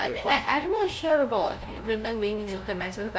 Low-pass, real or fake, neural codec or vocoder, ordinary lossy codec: none; fake; codec, 16 kHz, 0.5 kbps, FunCodec, trained on LibriTTS, 25 frames a second; none